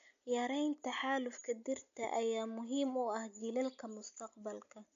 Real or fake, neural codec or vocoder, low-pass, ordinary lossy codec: real; none; 7.2 kHz; none